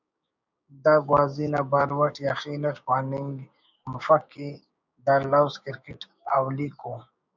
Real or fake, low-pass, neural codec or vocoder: fake; 7.2 kHz; codec, 16 kHz, 6 kbps, DAC